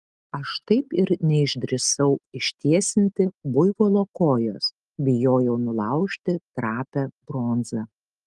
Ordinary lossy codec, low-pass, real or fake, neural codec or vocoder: Opus, 24 kbps; 10.8 kHz; real; none